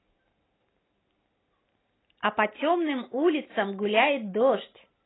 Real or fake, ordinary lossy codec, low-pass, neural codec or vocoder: real; AAC, 16 kbps; 7.2 kHz; none